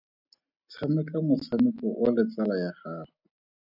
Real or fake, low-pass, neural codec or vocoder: real; 5.4 kHz; none